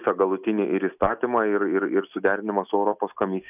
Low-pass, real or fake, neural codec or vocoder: 3.6 kHz; real; none